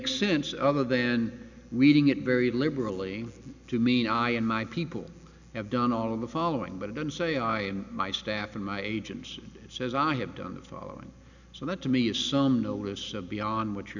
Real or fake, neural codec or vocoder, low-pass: real; none; 7.2 kHz